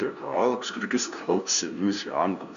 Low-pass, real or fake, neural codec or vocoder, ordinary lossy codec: 7.2 kHz; fake; codec, 16 kHz, 0.5 kbps, FunCodec, trained on LibriTTS, 25 frames a second; MP3, 96 kbps